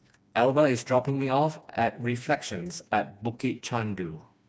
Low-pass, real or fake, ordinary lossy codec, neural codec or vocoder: none; fake; none; codec, 16 kHz, 2 kbps, FreqCodec, smaller model